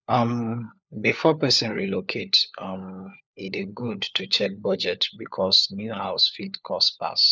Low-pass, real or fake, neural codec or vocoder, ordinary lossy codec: none; fake; codec, 16 kHz, 4 kbps, FunCodec, trained on LibriTTS, 50 frames a second; none